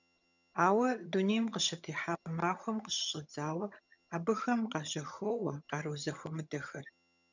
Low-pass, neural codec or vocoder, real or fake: 7.2 kHz; vocoder, 22.05 kHz, 80 mel bands, HiFi-GAN; fake